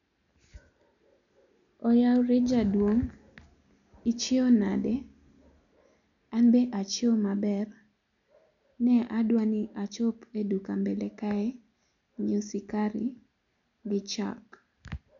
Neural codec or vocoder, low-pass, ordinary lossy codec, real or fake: none; 7.2 kHz; none; real